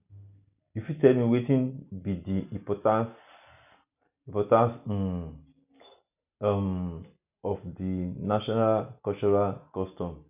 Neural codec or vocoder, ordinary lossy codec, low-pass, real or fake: none; none; 3.6 kHz; real